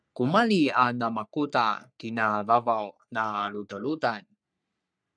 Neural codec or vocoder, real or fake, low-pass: codec, 44.1 kHz, 3.4 kbps, Pupu-Codec; fake; 9.9 kHz